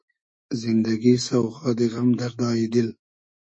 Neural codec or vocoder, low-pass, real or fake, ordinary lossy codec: autoencoder, 48 kHz, 128 numbers a frame, DAC-VAE, trained on Japanese speech; 9.9 kHz; fake; MP3, 32 kbps